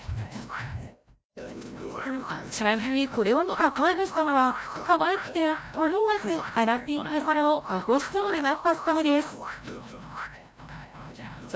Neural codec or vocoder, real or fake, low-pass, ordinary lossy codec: codec, 16 kHz, 0.5 kbps, FreqCodec, larger model; fake; none; none